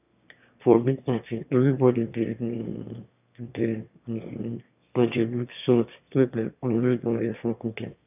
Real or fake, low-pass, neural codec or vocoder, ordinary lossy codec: fake; 3.6 kHz; autoencoder, 22.05 kHz, a latent of 192 numbers a frame, VITS, trained on one speaker; none